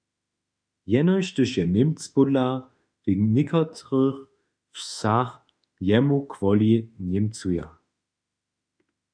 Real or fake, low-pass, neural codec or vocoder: fake; 9.9 kHz; autoencoder, 48 kHz, 32 numbers a frame, DAC-VAE, trained on Japanese speech